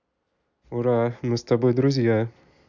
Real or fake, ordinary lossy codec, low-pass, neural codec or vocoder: real; none; 7.2 kHz; none